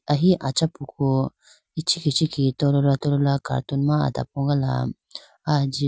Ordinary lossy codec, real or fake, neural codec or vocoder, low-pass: none; real; none; none